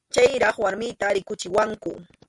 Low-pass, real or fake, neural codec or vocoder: 10.8 kHz; real; none